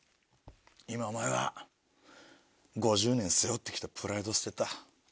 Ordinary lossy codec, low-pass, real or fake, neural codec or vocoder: none; none; real; none